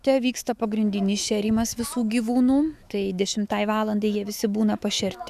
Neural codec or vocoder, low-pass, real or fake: autoencoder, 48 kHz, 128 numbers a frame, DAC-VAE, trained on Japanese speech; 14.4 kHz; fake